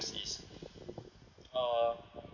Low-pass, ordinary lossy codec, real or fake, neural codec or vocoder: 7.2 kHz; none; real; none